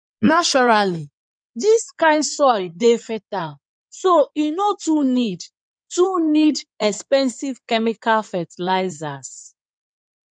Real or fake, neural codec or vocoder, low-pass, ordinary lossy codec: fake; codec, 16 kHz in and 24 kHz out, 2.2 kbps, FireRedTTS-2 codec; 9.9 kHz; none